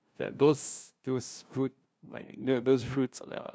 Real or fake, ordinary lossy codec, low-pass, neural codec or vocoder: fake; none; none; codec, 16 kHz, 0.5 kbps, FunCodec, trained on LibriTTS, 25 frames a second